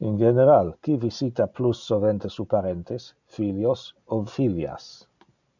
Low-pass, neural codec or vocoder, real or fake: 7.2 kHz; none; real